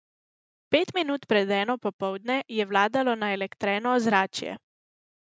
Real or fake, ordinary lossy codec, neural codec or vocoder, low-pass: real; none; none; none